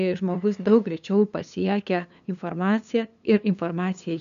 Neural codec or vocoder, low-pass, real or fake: codec, 16 kHz, 2 kbps, FunCodec, trained on LibriTTS, 25 frames a second; 7.2 kHz; fake